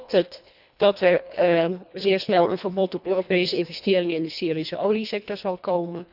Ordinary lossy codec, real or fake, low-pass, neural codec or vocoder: none; fake; 5.4 kHz; codec, 24 kHz, 1.5 kbps, HILCodec